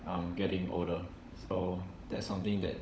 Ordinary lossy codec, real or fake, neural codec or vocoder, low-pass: none; fake; codec, 16 kHz, 16 kbps, FunCodec, trained on LibriTTS, 50 frames a second; none